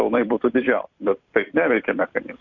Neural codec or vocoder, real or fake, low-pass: vocoder, 44.1 kHz, 128 mel bands every 256 samples, BigVGAN v2; fake; 7.2 kHz